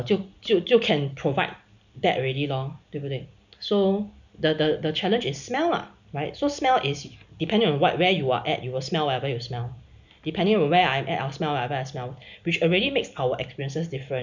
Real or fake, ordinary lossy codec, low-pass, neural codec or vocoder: real; none; 7.2 kHz; none